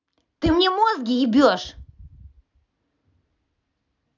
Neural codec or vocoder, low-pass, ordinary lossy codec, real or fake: none; 7.2 kHz; none; real